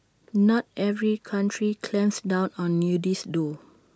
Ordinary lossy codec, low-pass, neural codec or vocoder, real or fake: none; none; none; real